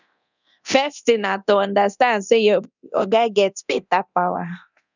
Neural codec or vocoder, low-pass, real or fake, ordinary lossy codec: codec, 24 kHz, 0.9 kbps, DualCodec; 7.2 kHz; fake; none